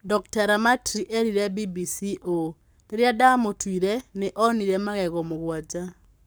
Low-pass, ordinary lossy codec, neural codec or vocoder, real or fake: none; none; codec, 44.1 kHz, 7.8 kbps, Pupu-Codec; fake